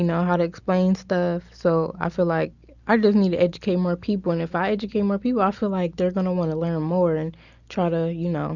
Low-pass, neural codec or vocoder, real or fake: 7.2 kHz; none; real